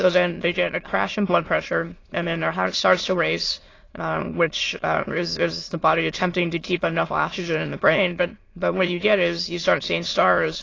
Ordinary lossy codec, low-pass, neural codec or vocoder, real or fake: AAC, 32 kbps; 7.2 kHz; autoencoder, 22.05 kHz, a latent of 192 numbers a frame, VITS, trained on many speakers; fake